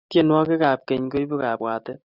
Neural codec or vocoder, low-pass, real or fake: none; 5.4 kHz; real